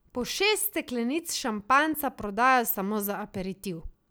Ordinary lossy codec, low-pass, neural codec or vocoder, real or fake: none; none; none; real